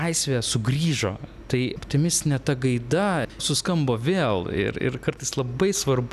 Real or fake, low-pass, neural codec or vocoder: real; 14.4 kHz; none